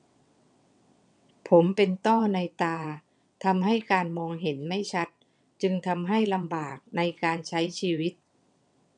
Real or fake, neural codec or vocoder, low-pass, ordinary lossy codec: fake; vocoder, 22.05 kHz, 80 mel bands, WaveNeXt; 9.9 kHz; none